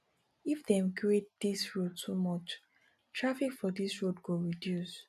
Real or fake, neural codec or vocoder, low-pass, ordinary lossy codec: real; none; 14.4 kHz; none